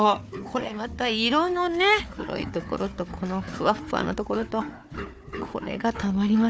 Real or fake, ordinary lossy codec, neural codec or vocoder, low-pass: fake; none; codec, 16 kHz, 4 kbps, FunCodec, trained on Chinese and English, 50 frames a second; none